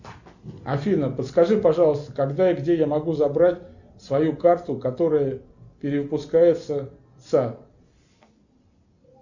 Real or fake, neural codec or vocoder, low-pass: real; none; 7.2 kHz